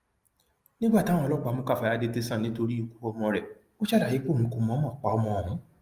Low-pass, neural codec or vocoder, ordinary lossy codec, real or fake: 14.4 kHz; none; Opus, 32 kbps; real